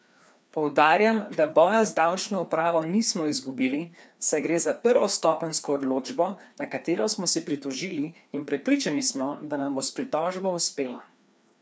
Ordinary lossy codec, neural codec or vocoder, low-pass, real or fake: none; codec, 16 kHz, 2 kbps, FreqCodec, larger model; none; fake